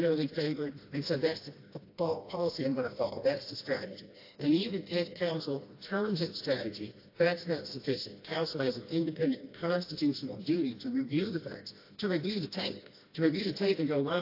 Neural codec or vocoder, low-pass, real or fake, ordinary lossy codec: codec, 16 kHz, 1 kbps, FreqCodec, smaller model; 5.4 kHz; fake; AAC, 32 kbps